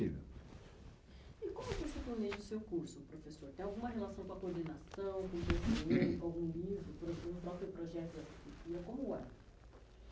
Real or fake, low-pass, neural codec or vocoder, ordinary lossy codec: real; none; none; none